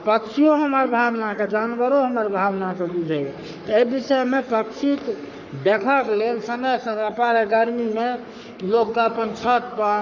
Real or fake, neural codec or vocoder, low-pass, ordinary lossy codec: fake; codec, 44.1 kHz, 3.4 kbps, Pupu-Codec; 7.2 kHz; none